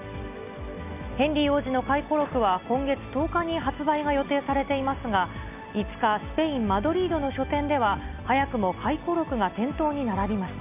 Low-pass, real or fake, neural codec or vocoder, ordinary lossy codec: 3.6 kHz; real; none; none